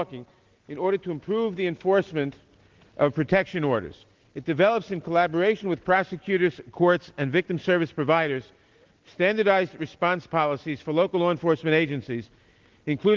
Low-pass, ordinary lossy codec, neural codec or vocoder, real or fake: 7.2 kHz; Opus, 16 kbps; none; real